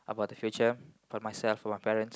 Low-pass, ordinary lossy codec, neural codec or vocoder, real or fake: none; none; none; real